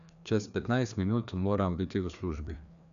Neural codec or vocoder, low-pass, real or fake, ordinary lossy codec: codec, 16 kHz, 2 kbps, FreqCodec, larger model; 7.2 kHz; fake; none